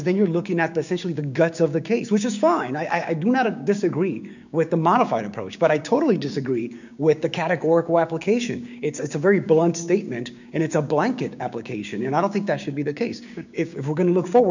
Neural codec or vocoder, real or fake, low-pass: codec, 16 kHz, 6 kbps, DAC; fake; 7.2 kHz